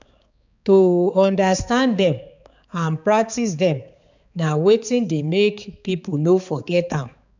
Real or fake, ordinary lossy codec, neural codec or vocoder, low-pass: fake; none; codec, 16 kHz, 4 kbps, X-Codec, HuBERT features, trained on balanced general audio; 7.2 kHz